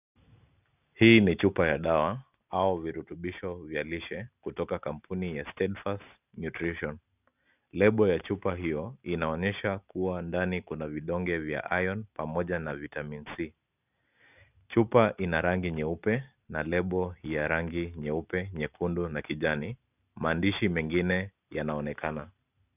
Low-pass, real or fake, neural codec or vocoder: 3.6 kHz; real; none